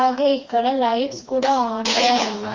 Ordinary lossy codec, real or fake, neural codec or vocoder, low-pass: Opus, 32 kbps; fake; codec, 16 kHz, 2 kbps, FreqCodec, smaller model; 7.2 kHz